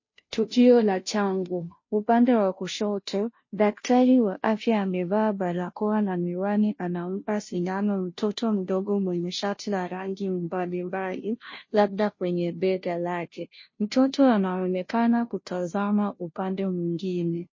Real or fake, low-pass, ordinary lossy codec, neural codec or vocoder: fake; 7.2 kHz; MP3, 32 kbps; codec, 16 kHz, 0.5 kbps, FunCodec, trained on Chinese and English, 25 frames a second